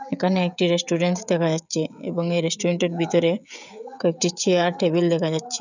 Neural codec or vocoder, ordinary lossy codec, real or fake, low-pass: codec, 16 kHz, 16 kbps, FreqCodec, smaller model; none; fake; 7.2 kHz